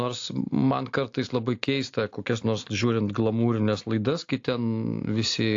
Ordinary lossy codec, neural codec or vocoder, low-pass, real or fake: AAC, 48 kbps; none; 7.2 kHz; real